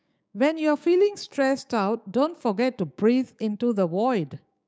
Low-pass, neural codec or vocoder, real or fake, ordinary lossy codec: none; codec, 16 kHz, 6 kbps, DAC; fake; none